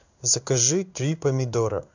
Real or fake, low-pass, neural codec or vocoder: fake; 7.2 kHz; codec, 16 kHz in and 24 kHz out, 1 kbps, XY-Tokenizer